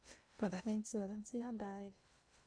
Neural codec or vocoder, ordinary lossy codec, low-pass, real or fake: codec, 16 kHz in and 24 kHz out, 0.6 kbps, FocalCodec, streaming, 2048 codes; none; 9.9 kHz; fake